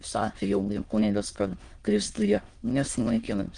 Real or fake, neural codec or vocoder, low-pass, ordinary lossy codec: fake; autoencoder, 22.05 kHz, a latent of 192 numbers a frame, VITS, trained on many speakers; 9.9 kHz; Opus, 32 kbps